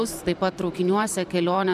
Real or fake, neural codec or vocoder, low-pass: fake; vocoder, 44.1 kHz, 128 mel bands every 512 samples, BigVGAN v2; 14.4 kHz